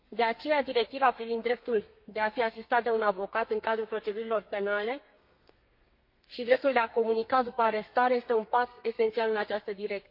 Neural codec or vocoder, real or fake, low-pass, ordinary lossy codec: codec, 32 kHz, 1.9 kbps, SNAC; fake; 5.4 kHz; MP3, 32 kbps